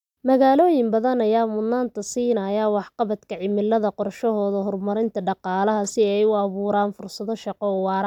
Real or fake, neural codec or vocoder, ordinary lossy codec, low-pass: real; none; none; 19.8 kHz